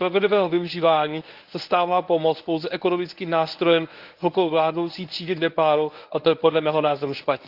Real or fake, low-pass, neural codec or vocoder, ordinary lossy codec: fake; 5.4 kHz; codec, 24 kHz, 0.9 kbps, WavTokenizer, medium speech release version 1; Opus, 32 kbps